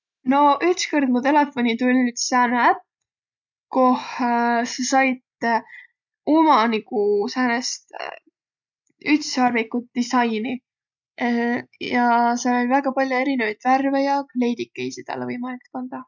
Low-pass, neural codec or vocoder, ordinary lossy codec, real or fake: 7.2 kHz; none; none; real